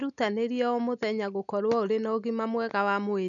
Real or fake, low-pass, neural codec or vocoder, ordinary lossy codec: real; 7.2 kHz; none; none